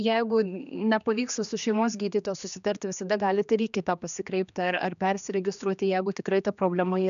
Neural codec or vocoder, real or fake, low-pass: codec, 16 kHz, 2 kbps, X-Codec, HuBERT features, trained on general audio; fake; 7.2 kHz